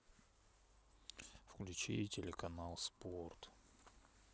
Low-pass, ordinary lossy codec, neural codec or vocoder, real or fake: none; none; none; real